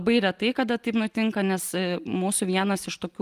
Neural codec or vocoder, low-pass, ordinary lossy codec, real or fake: none; 14.4 kHz; Opus, 32 kbps; real